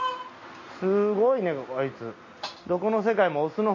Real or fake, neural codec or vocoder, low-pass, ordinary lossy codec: real; none; 7.2 kHz; MP3, 32 kbps